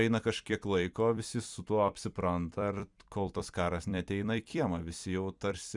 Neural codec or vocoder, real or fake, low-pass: vocoder, 44.1 kHz, 128 mel bands every 256 samples, BigVGAN v2; fake; 10.8 kHz